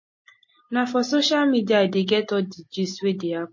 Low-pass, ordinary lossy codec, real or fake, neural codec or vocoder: 7.2 kHz; MP3, 32 kbps; real; none